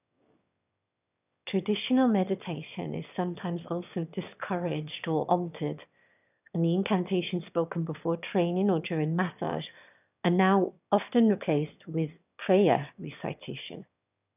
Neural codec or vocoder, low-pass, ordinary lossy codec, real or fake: autoencoder, 22.05 kHz, a latent of 192 numbers a frame, VITS, trained on one speaker; 3.6 kHz; none; fake